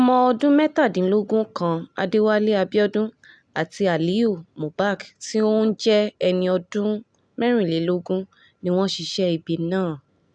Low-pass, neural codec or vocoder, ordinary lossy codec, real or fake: 9.9 kHz; none; none; real